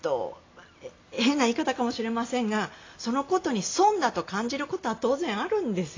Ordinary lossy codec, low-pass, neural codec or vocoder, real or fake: AAC, 32 kbps; 7.2 kHz; none; real